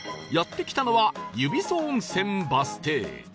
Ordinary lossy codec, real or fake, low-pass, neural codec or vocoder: none; real; none; none